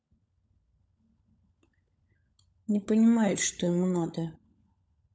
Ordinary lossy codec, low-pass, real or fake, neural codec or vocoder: none; none; fake; codec, 16 kHz, 16 kbps, FunCodec, trained on LibriTTS, 50 frames a second